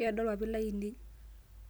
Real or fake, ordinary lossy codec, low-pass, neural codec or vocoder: real; none; none; none